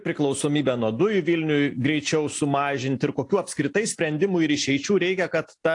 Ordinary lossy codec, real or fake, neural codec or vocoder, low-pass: AAC, 48 kbps; real; none; 10.8 kHz